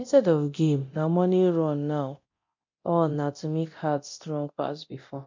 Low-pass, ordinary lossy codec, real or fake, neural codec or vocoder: 7.2 kHz; MP3, 48 kbps; fake; codec, 24 kHz, 0.9 kbps, DualCodec